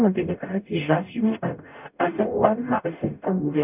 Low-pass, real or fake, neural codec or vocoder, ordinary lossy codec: 3.6 kHz; fake; codec, 44.1 kHz, 0.9 kbps, DAC; none